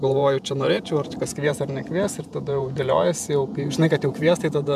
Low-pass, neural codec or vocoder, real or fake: 14.4 kHz; vocoder, 48 kHz, 128 mel bands, Vocos; fake